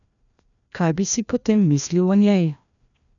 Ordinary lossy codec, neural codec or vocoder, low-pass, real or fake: none; codec, 16 kHz, 1 kbps, FreqCodec, larger model; 7.2 kHz; fake